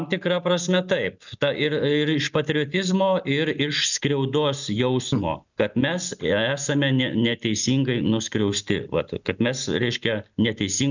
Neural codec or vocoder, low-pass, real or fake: none; 7.2 kHz; real